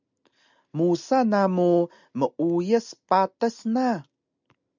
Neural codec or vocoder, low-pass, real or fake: none; 7.2 kHz; real